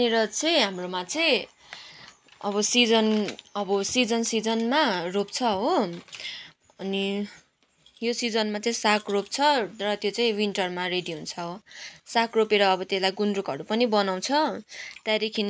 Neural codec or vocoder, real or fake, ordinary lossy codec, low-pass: none; real; none; none